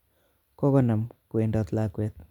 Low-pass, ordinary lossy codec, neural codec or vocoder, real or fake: 19.8 kHz; none; vocoder, 44.1 kHz, 128 mel bands every 256 samples, BigVGAN v2; fake